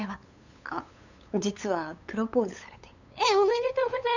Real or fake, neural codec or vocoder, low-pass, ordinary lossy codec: fake; codec, 16 kHz, 8 kbps, FunCodec, trained on LibriTTS, 25 frames a second; 7.2 kHz; none